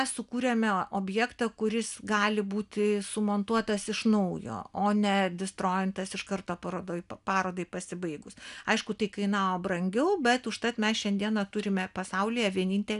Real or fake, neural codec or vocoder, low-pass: real; none; 10.8 kHz